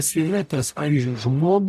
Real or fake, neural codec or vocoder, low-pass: fake; codec, 44.1 kHz, 0.9 kbps, DAC; 14.4 kHz